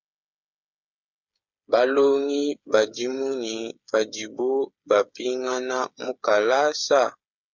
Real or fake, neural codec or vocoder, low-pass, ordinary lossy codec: fake; codec, 16 kHz, 8 kbps, FreqCodec, smaller model; 7.2 kHz; Opus, 64 kbps